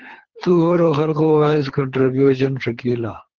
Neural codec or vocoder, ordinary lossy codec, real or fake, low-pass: codec, 24 kHz, 3 kbps, HILCodec; Opus, 16 kbps; fake; 7.2 kHz